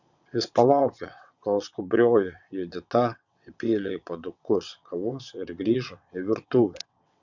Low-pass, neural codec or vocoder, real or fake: 7.2 kHz; vocoder, 22.05 kHz, 80 mel bands, WaveNeXt; fake